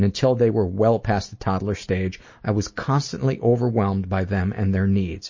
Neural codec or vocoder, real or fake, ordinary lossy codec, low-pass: none; real; MP3, 32 kbps; 7.2 kHz